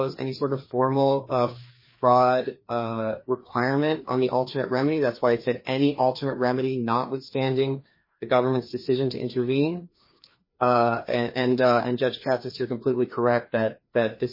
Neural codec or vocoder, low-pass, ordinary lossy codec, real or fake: codec, 16 kHz, 2 kbps, FreqCodec, larger model; 5.4 kHz; MP3, 24 kbps; fake